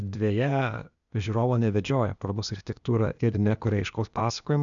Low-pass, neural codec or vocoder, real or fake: 7.2 kHz; codec, 16 kHz, 0.8 kbps, ZipCodec; fake